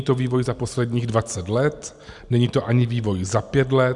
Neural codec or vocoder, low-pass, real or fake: none; 10.8 kHz; real